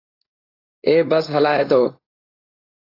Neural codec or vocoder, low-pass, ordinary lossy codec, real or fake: codec, 16 kHz, 4.8 kbps, FACodec; 5.4 kHz; AAC, 24 kbps; fake